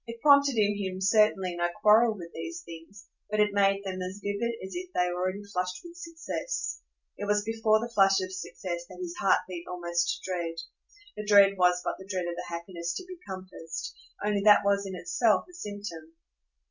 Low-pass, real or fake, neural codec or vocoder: 7.2 kHz; real; none